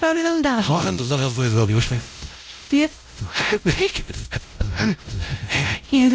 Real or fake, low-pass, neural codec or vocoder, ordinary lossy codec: fake; none; codec, 16 kHz, 0.5 kbps, X-Codec, WavLM features, trained on Multilingual LibriSpeech; none